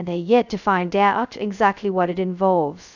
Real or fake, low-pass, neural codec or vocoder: fake; 7.2 kHz; codec, 16 kHz, 0.2 kbps, FocalCodec